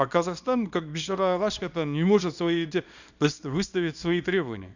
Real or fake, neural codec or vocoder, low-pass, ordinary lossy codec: fake; codec, 24 kHz, 0.9 kbps, WavTokenizer, small release; 7.2 kHz; none